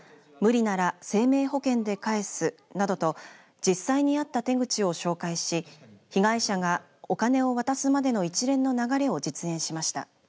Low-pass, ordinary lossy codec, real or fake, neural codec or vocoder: none; none; real; none